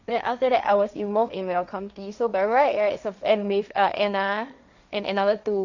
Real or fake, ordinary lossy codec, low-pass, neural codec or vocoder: fake; none; 7.2 kHz; codec, 16 kHz, 1.1 kbps, Voila-Tokenizer